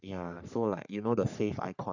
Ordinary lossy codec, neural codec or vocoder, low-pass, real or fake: none; codec, 44.1 kHz, 3.4 kbps, Pupu-Codec; 7.2 kHz; fake